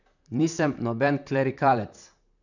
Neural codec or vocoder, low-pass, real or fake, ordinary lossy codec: vocoder, 44.1 kHz, 128 mel bands, Pupu-Vocoder; 7.2 kHz; fake; none